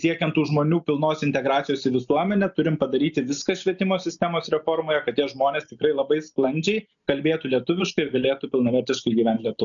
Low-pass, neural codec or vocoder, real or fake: 7.2 kHz; none; real